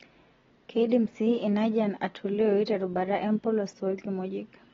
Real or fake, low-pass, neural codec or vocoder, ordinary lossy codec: real; 7.2 kHz; none; AAC, 24 kbps